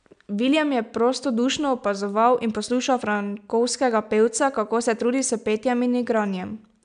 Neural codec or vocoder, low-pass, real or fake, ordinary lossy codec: none; 9.9 kHz; real; none